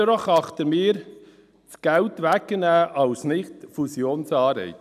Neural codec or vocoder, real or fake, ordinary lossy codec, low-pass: none; real; none; 14.4 kHz